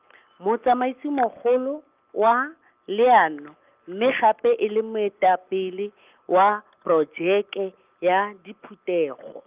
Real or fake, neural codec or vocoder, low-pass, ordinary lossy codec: real; none; 3.6 kHz; Opus, 24 kbps